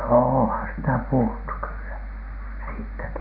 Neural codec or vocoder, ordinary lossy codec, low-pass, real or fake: none; none; 5.4 kHz; real